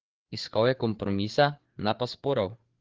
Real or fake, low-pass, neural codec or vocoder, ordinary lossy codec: fake; 7.2 kHz; codec, 16 kHz, 4 kbps, FreqCodec, larger model; Opus, 16 kbps